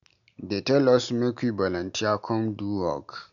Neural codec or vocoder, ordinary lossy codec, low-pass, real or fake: none; none; 7.2 kHz; real